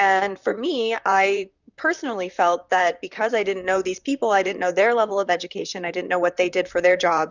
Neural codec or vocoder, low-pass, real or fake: vocoder, 44.1 kHz, 128 mel bands, Pupu-Vocoder; 7.2 kHz; fake